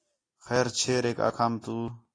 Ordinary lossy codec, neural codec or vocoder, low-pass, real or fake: AAC, 32 kbps; none; 9.9 kHz; real